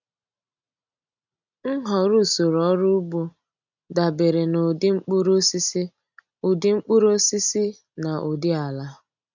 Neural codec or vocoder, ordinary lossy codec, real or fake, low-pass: none; none; real; 7.2 kHz